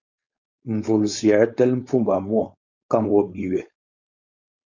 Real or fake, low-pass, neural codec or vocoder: fake; 7.2 kHz; codec, 16 kHz, 4.8 kbps, FACodec